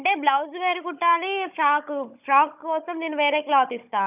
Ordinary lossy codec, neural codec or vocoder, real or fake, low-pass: none; codec, 16 kHz, 16 kbps, FunCodec, trained on Chinese and English, 50 frames a second; fake; 3.6 kHz